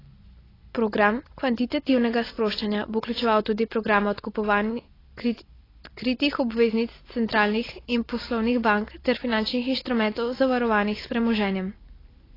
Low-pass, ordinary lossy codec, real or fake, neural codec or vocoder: 5.4 kHz; AAC, 24 kbps; real; none